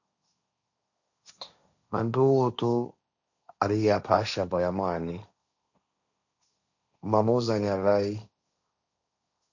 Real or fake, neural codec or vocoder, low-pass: fake; codec, 16 kHz, 1.1 kbps, Voila-Tokenizer; 7.2 kHz